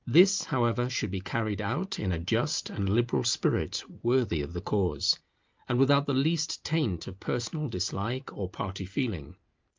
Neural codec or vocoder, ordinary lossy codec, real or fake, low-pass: none; Opus, 24 kbps; real; 7.2 kHz